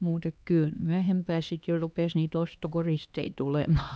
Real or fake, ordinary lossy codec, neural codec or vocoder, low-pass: fake; none; codec, 16 kHz, 2 kbps, X-Codec, HuBERT features, trained on LibriSpeech; none